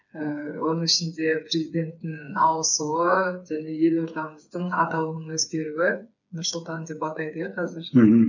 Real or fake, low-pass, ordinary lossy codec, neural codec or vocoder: fake; 7.2 kHz; none; codec, 16 kHz, 4 kbps, FreqCodec, smaller model